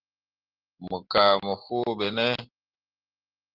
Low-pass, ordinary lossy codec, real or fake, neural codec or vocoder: 5.4 kHz; Opus, 16 kbps; real; none